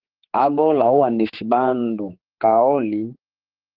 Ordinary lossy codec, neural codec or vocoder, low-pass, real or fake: Opus, 16 kbps; codec, 16 kHz, 4 kbps, X-Codec, HuBERT features, trained on general audio; 5.4 kHz; fake